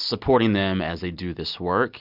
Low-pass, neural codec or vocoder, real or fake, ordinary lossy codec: 5.4 kHz; none; real; Opus, 64 kbps